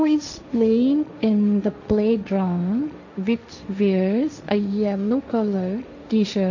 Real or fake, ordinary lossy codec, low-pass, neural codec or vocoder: fake; none; 7.2 kHz; codec, 16 kHz, 1.1 kbps, Voila-Tokenizer